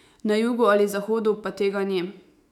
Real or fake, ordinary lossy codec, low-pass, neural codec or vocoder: fake; none; 19.8 kHz; autoencoder, 48 kHz, 128 numbers a frame, DAC-VAE, trained on Japanese speech